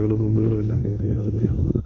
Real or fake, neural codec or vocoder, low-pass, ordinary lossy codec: fake; codec, 16 kHz in and 24 kHz out, 1 kbps, XY-Tokenizer; 7.2 kHz; none